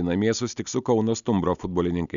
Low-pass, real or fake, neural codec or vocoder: 7.2 kHz; real; none